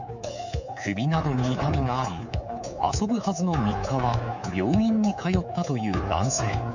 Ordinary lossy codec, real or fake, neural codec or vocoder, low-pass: none; fake; codec, 24 kHz, 3.1 kbps, DualCodec; 7.2 kHz